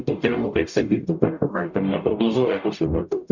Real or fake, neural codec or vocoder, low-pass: fake; codec, 44.1 kHz, 0.9 kbps, DAC; 7.2 kHz